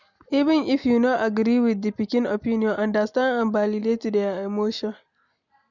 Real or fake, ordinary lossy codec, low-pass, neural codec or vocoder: real; none; 7.2 kHz; none